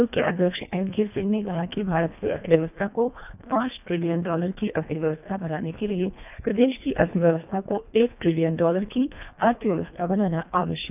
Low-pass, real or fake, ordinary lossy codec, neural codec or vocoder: 3.6 kHz; fake; none; codec, 24 kHz, 1.5 kbps, HILCodec